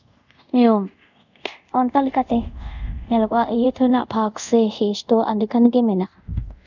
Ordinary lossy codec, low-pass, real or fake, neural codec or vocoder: none; 7.2 kHz; fake; codec, 24 kHz, 0.5 kbps, DualCodec